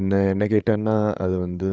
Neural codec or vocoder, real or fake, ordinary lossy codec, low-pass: codec, 16 kHz, 8 kbps, FreqCodec, larger model; fake; none; none